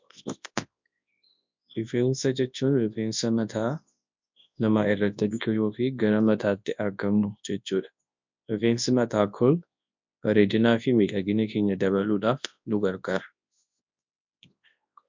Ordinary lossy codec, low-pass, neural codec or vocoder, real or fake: MP3, 64 kbps; 7.2 kHz; codec, 24 kHz, 0.9 kbps, WavTokenizer, large speech release; fake